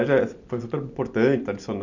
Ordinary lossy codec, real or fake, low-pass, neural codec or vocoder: none; real; 7.2 kHz; none